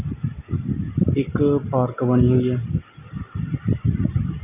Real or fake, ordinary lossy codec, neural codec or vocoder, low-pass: real; none; none; 3.6 kHz